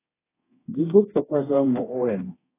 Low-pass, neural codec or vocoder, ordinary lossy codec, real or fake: 3.6 kHz; codec, 24 kHz, 0.9 kbps, DualCodec; AAC, 16 kbps; fake